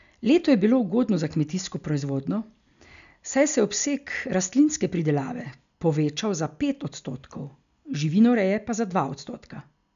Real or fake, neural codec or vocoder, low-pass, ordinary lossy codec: real; none; 7.2 kHz; none